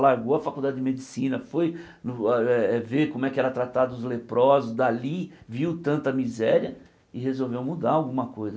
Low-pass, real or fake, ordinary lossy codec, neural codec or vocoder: none; real; none; none